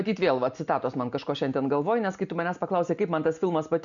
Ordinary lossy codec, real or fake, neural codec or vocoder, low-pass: MP3, 96 kbps; real; none; 7.2 kHz